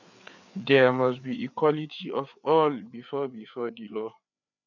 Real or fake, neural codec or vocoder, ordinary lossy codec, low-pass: fake; codec, 16 kHz, 4 kbps, FreqCodec, larger model; none; 7.2 kHz